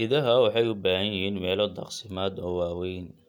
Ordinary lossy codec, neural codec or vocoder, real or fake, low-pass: none; none; real; 19.8 kHz